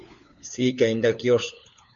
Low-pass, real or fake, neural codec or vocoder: 7.2 kHz; fake; codec, 16 kHz, 4 kbps, FunCodec, trained on LibriTTS, 50 frames a second